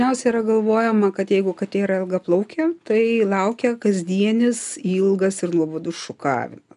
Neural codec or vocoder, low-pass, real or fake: none; 10.8 kHz; real